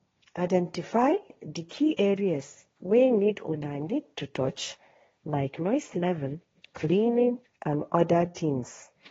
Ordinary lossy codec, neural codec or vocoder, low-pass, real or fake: AAC, 24 kbps; codec, 16 kHz, 1.1 kbps, Voila-Tokenizer; 7.2 kHz; fake